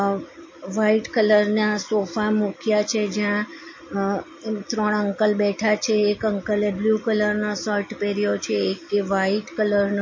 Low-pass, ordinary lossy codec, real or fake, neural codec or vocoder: 7.2 kHz; MP3, 32 kbps; real; none